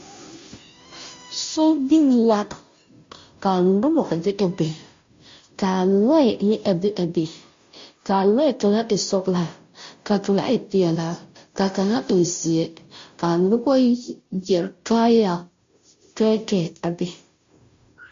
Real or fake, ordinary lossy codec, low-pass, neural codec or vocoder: fake; MP3, 48 kbps; 7.2 kHz; codec, 16 kHz, 0.5 kbps, FunCodec, trained on Chinese and English, 25 frames a second